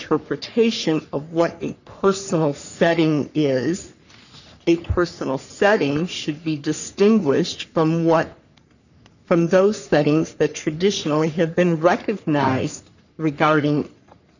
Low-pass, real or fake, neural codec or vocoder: 7.2 kHz; fake; codec, 44.1 kHz, 3.4 kbps, Pupu-Codec